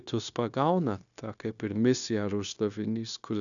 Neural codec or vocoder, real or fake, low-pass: codec, 16 kHz, 0.9 kbps, LongCat-Audio-Codec; fake; 7.2 kHz